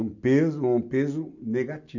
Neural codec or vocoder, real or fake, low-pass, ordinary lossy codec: none; real; 7.2 kHz; none